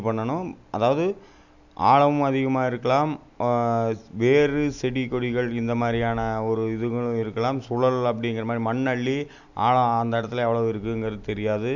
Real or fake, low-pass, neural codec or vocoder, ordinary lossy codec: real; 7.2 kHz; none; none